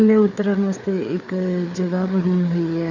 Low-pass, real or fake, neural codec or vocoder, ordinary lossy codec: 7.2 kHz; fake; codec, 16 kHz, 4 kbps, FreqCodec, larger model; none